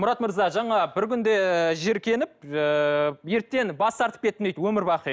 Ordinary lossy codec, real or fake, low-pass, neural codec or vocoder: none; real; none; none